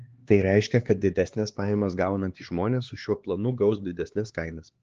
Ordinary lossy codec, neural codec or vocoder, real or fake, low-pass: Opus, 16 kbps; codec, 16 kHz, 2 kbps, X-Codec, HuBERT features, trained on LibriSpeech; fake; 7.2 kHz